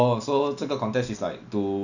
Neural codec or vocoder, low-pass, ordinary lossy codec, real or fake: none; 7.2 kHz; none; real